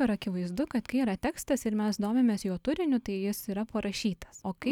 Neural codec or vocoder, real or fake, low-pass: none; real; 19.8 kHz